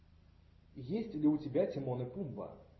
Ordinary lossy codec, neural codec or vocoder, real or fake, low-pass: MP3, 24 kbps; none; real; 7.2 kHz